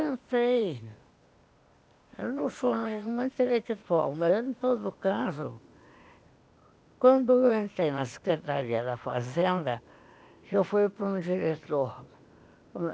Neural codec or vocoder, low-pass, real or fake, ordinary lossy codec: codec, 16 kHz, 0.8 kbps, ZipCodec; none; fake; none